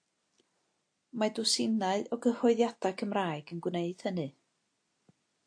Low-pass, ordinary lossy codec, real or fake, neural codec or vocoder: 9.9 kHz; AAC, 48 kbps; real; none